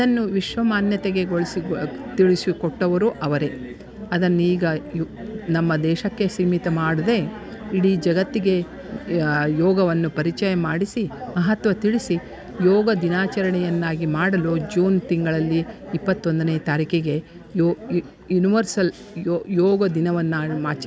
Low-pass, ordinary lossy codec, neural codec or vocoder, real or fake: none; none; none; real